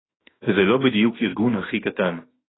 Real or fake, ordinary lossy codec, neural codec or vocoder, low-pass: fake; AAC, 16 kbps; autoencoder, 48 kHz, 32 numbers a frame, DAC-VAE, trained on Japanese speech; 7.2 kHz